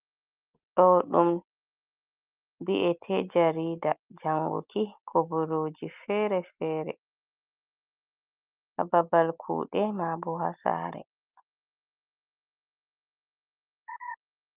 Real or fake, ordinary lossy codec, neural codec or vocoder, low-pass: real; Opus, 24 kbps; none; 3.6 kHz